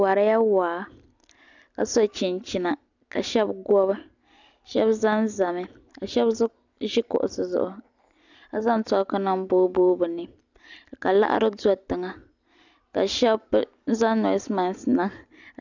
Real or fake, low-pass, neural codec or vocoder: real; 7.2 kHz; none